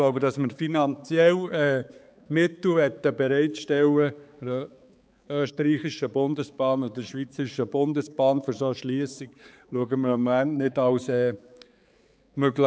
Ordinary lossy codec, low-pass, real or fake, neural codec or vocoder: none; none; fake; codec, 16 kHz, 4 kbps, X-Codec, HuBERT features, trained on balanced general audio